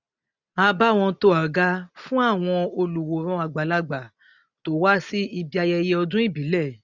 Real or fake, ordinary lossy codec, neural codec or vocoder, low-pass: real; none; none; 7.2 kHz